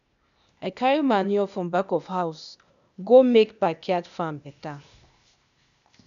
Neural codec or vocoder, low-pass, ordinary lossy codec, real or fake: codec, 16 kHz, 0.8 kbps, ZipCodec; 7.2 kHz; none; fake